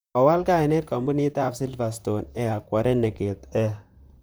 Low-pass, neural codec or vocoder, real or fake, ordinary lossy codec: none; vocoder, 44.1 kHz, 128 mel bands, Pupu-Vocoder; fake; none